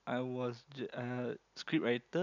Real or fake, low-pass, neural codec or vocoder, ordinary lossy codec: real; 7.2 kHz; none; none